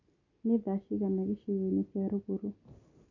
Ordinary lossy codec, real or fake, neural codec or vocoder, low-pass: MP3, 64 kbps; real; none; 7.2 kHz